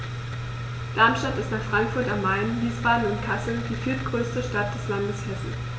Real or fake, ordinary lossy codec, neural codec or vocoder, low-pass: real; none; none; none